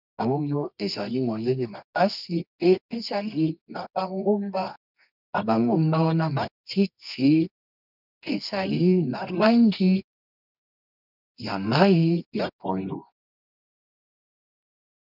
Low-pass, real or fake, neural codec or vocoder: 5.4 kHz; fake; codec, 24 kHz, 0.9 kbps, WavTokenizer, medium music audio release